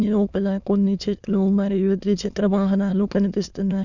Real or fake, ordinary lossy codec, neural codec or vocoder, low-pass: fake; none; autoencoder, 22.05 kHz, a latent of 192 numbers a frame, VITS, trained on many speakers; 7.2 kHz